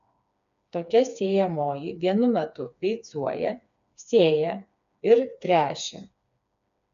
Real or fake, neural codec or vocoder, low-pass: fake; codec, 16 kHz, 4 kbps, FreqCodec, smaller model; 7.2 kHz